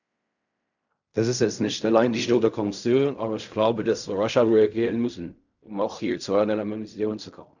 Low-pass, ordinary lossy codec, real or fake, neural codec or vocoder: 7.2 kHz; none; fake; codec, 16 kHz in and 24 kHz out, 0.4 kbps, LongCat-Audio-Codec, fine tuned four codebook decoder